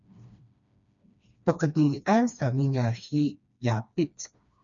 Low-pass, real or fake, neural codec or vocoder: 7.2 kHz; fake; codec, 16 kHz, 2 kbps, FreqCodec, smaller model